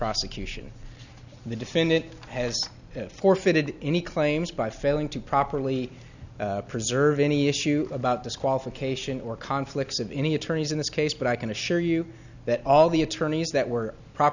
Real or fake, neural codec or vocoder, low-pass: real; none; 7.2 kHz